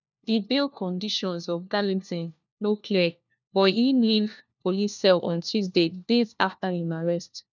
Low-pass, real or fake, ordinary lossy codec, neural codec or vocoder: 7.2 kHz; fake; none; codec, 16 kHz, 1 kbps, FunCodec, trained on LibriTTS, 50 frames a second